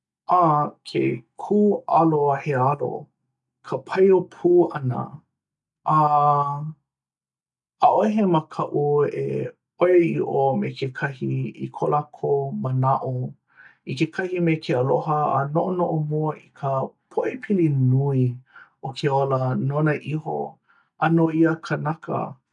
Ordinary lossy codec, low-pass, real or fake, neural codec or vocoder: none; 10.8 kHz; real; none